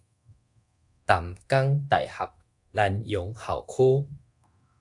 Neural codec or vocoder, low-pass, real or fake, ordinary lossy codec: codec, 24 kHz, 0.9 kbps, DualCodec; 10.8 kHz; fake; AAC, 64 kbps